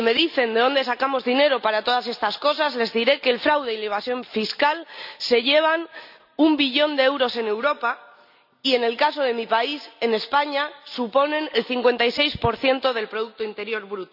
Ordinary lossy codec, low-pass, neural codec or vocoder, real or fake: none; 5.4 kHz; none; real